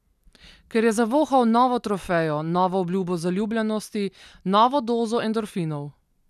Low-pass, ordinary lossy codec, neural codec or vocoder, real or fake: 14.4 kHz; none; none; real